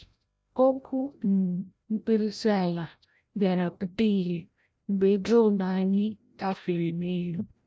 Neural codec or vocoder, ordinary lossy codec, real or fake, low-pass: codec, 16 kHz, 0.5 kbps, FreqCodec, larger model; none; fake; none